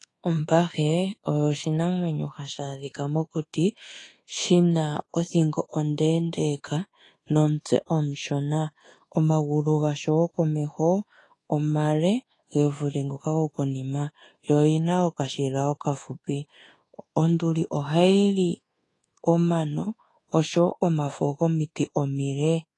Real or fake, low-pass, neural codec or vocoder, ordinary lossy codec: fake; 10.8 kHz; codec, 24 kHz, 1.2 kbps, DualCodec; AAC, 32 kbps